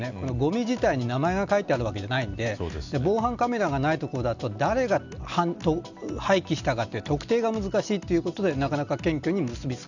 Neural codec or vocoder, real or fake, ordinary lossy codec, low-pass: none; real; none; 7.2 kHz